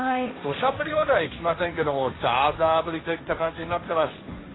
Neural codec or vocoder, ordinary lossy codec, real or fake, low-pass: codec, 16 kHz, 1.1 kbps, Voila-Tokenizer; AAC, 16 kbps; fake; 7.2 kHz